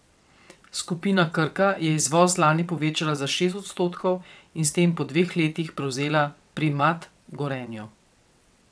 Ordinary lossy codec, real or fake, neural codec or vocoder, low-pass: none; fake; vocoder, 22.05 kHz, 80 mel bands, WaveNeXt; none